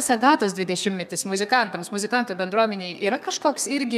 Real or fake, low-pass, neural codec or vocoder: fake; 14.4 kHz; codec, 32 kHz, 1.9 kbps, SNAC